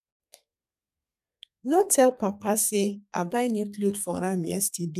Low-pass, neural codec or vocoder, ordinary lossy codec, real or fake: 14.4 kHz; codec, 32 kHz, 1.9 kbps, SNAC; none; fake